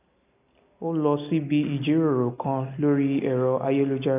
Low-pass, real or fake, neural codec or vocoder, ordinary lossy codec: 3.6 kHz; real; none; none